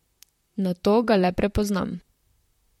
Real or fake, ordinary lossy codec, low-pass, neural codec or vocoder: fake; MP3, 64 kbps; 19.8 kHz; vocoder, 44.1 kHz, 128 mel bands, Pupu-Vocoder